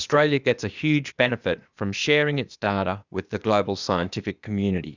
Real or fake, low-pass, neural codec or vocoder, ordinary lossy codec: fake; 7.2 kHz; codec, 16 kHz, 0.8 kbps, ZipCodec; Opus, 64 kbps